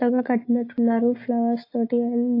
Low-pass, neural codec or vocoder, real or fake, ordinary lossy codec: 5.4 kHz; none; real; AAC, 32 kbps